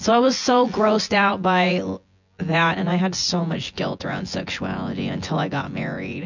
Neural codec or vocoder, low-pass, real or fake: vocoder, 24 kHz, 100 mel bands, Vocos; 7.2 kHz; fake